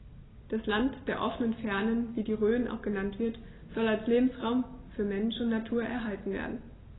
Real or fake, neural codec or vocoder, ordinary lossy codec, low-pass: real; none; AAC, 16 kbps; 7.2 kHz